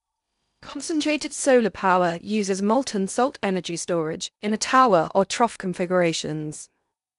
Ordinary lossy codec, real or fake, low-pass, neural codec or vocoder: none; fake; 10.8 kHz; codec, 16 kHz in and 24 kHz out, 0.8 kbps, FocalCodec, streaming, 65536 codes